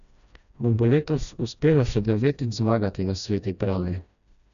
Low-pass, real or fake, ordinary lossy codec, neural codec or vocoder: 7.2 kHz; fake; none; codec, 16 kHz, 1 kbps, FreqCodec, smaller model